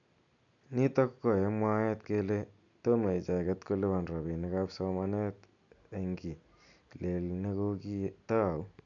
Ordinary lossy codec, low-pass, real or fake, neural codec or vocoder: none; 7.2 kHz; real; none